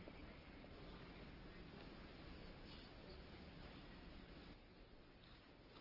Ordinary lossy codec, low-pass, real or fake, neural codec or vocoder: Opus, 24 kbps; 5.4 kHz; real; none